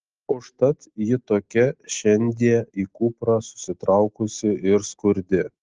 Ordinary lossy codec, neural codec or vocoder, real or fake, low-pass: Opus, 16 kbps; none; real; 7.2 kHz